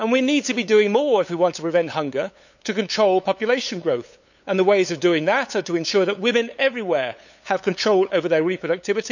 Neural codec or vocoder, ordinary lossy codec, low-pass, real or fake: codec, 16 kHz, 8 kbps, FunCodec, trained on LibriTTS, 25 frames a second; none; 7.2 kHz; fake